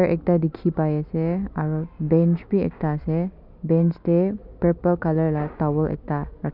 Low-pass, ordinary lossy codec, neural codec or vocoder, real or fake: 5.4 kHz; none; none; real